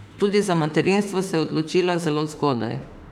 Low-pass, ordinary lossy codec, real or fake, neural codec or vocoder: 19.8 kHz; none; fake; autoencoder, 48 kHz, 32 numbers a frame, DAC-VAE, trained on Japanese speech